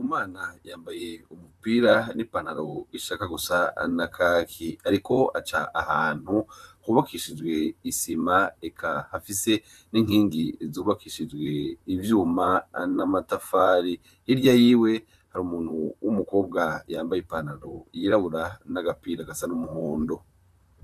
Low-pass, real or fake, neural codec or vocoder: 14.4 kHz; fake; vocoder, 44.1 kHz, 128 mel bands, Pupu-Vocoder